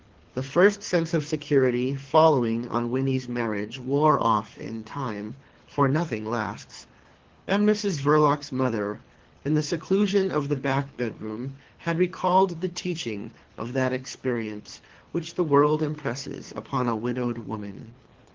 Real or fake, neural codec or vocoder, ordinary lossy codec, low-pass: fake; codec, 24 kHz, 3 kbps, HILCodec; Opus, 16 kbps; 7.2 kHz